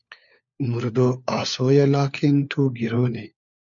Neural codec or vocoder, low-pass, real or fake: codec, 16 kHz, 4 kbps, FunCodec, trained on LibriTTS, 50 frames a second; 7.2 kHz; fake